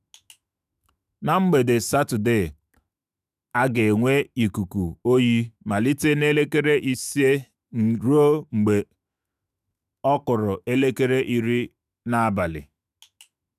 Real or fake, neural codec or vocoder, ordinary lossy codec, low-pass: fake; autoencoder, 48 kHz, 128 numbers a frame, DAC-VAE, trained on Japanese speech; none; 14.4 kHz